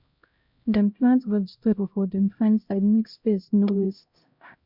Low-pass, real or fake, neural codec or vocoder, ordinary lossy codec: 5.4 kHz; fake; codec, 16 kHz, 0.5 kbps, X-Codec, HuBERT features, trained on LibriSpeech; MP3, 48 kbps